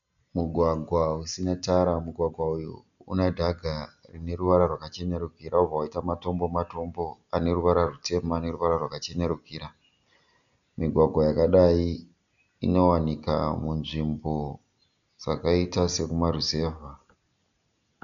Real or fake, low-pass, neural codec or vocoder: real; 7.2 kHz; none